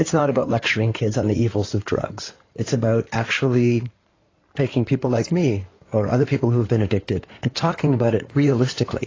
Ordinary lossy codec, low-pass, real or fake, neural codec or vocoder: AAC, 32 kbps; 7.2 kHz; fake; codec, 16 kHz in and 24 kHz out, 2.2 kbps, FireRedTTS-2 codec